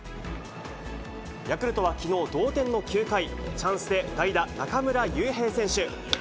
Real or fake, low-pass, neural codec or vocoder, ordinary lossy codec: real; none; none; none